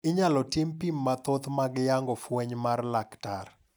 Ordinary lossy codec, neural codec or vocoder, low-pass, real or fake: none; none; none; real